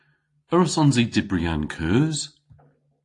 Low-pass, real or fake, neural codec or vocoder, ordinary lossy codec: 10.8 kHz; real; none; AAC, 48 kbps